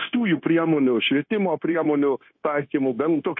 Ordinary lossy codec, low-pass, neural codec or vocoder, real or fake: MP3, 32 kbps; 7.2 kHz; codec, 16 kHz, 0.9 kbps, LongCat-Audio-Codec; fake